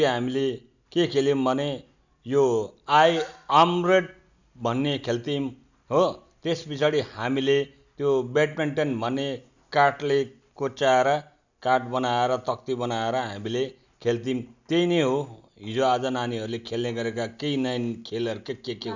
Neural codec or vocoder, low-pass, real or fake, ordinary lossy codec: none; 7.2 kHz; real; none